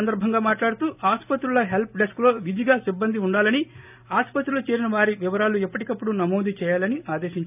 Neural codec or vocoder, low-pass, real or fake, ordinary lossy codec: none; 3.6 kHz; real; none